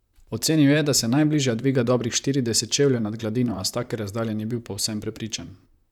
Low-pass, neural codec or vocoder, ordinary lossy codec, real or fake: 19.8 kHz; vocoder, 44.1 kHz, 128 mel bands, Pupu-Vocoder; none; fake